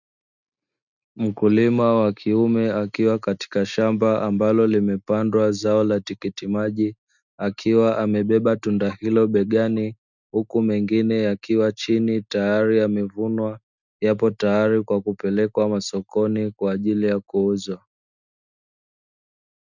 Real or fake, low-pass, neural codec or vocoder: real; 7.2 kHz; none